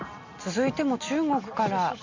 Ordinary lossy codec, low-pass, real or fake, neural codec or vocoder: MP3, 48 kbps; 7.2 kHz; real; none